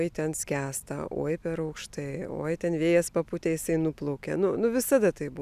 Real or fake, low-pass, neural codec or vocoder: real; 14.4 kHz; none